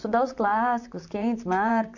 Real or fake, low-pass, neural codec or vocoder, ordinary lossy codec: fake; 7.2 kHz; vocoder, 44.1 kHz, 128 mel bands, Pupu-Vocoder; none